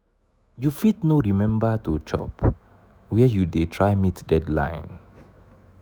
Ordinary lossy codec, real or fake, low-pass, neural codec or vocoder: none; fake; none; autoencoder, 48 kHz, 128 numbers a frame, DAC-VAE, trained on Japanese speech